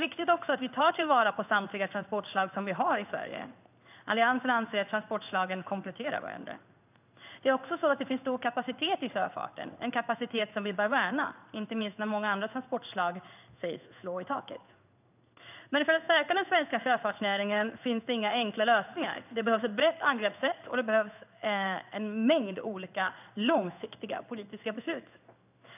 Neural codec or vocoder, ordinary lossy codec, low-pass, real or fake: codec, 16 kHz in and 24 kHz out, 1 kbps, XY-Tokenizer; none; 3.6 kHz; fake